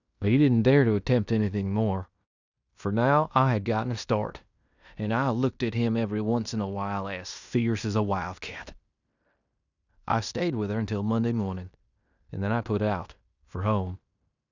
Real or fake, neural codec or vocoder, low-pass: fake; codec, 16 kHz in and 24 kHz out, 0.9 kbps, LongCat-Audio-Codec, fine tuned four codebook decoder; 7.2 kHz